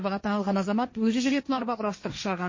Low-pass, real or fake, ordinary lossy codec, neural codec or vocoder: 7.2 kHz; fake; MP3, 32 kbps; codec, 16 kHz, 1.1 kbps, Voila-Tokenizer